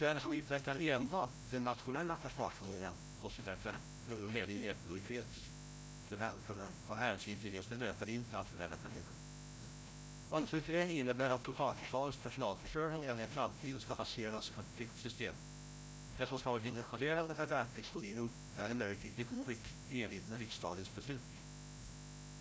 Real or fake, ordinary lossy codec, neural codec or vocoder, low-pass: fake; none; codec, 16 kHz, 0.5 kbps, FreqCodec, larger model; none